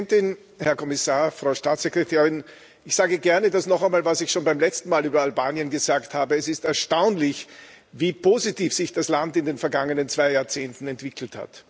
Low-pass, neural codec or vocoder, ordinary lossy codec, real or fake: none; none; none; real